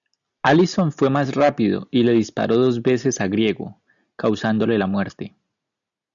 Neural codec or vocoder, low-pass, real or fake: none; 7.2 kHz; real